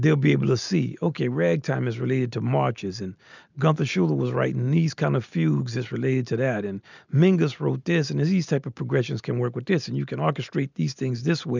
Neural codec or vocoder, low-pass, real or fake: none; 7.2 kHz; real